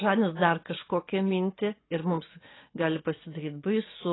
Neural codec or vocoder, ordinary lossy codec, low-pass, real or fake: vocoder, 24 kHz, 100 mel bands, Vocos; AAC, 16 kbps; 7.2 kHz; fake